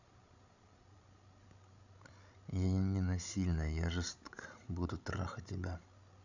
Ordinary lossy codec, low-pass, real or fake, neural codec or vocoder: none; 7.2 kHz; fake; codec, 16 kHz, 16 kbps, FreqCodec, larger model